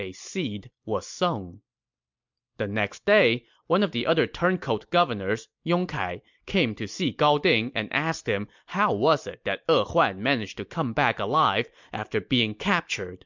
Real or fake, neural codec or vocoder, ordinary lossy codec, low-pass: real; none; MP3, 64 kbps; 7.2 kHz